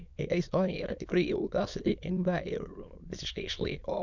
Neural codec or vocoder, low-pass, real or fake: autoencoder, 22.05 kHz, a latent of 192 numbers a frame, VITS, trained on many speakers; 7.2 kHz; fake